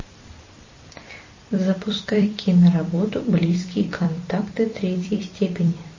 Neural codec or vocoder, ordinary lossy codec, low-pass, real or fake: vocoder, 44.1 kHz, 128 mel bands every 256 samples, BigVGAN v2; MP3, 32 kbps; 7.2 kHz; fake